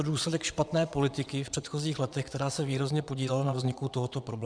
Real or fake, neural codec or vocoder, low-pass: fake; vocoder, 22.05 kHz, 80 mel bands, WaveNeXt; 9.9 kHz